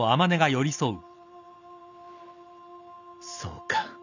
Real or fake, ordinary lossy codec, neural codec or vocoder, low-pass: real; none; none; 7.2 kHz